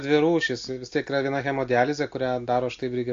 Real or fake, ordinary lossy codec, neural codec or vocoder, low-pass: real; AAC, 48 kbps; none; 7.2 kHz